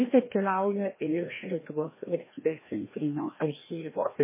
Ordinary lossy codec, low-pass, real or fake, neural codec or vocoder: MP3, 16 kbps; 3.6 kHz; fake; codec, 16 kHz, 1 kbps, FreqCodec, larger model